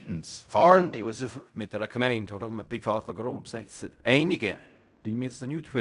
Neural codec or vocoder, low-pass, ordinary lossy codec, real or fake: codec, 16 kHz in and 24 kHz out, 0.4 kbps, LongCat-Audio-Codec, fine tuned four codebook decoder; 10.8 kHz; none; fake